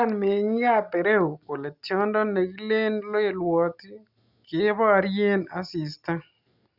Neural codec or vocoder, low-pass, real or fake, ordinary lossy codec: none; 5.4 kHz; real; Opus, 64 kbps